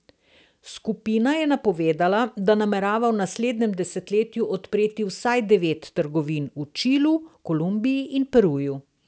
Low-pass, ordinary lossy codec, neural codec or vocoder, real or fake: none; none; none; real